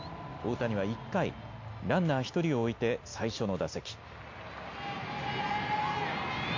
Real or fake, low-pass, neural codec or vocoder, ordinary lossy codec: real; 7.2 kHz; none; MP3, 48 kbps